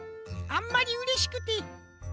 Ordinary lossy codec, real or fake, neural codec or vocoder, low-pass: none; real; none; none